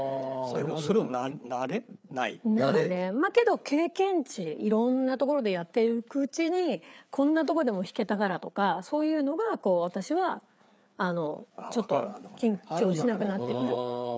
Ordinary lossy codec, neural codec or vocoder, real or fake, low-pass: none; codec, 16 kHz, 4 kbps, FreqCodec, larger model; fake; none